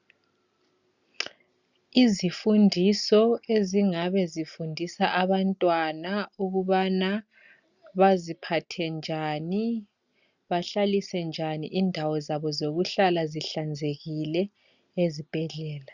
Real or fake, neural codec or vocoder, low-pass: real; none; 7.2 kHz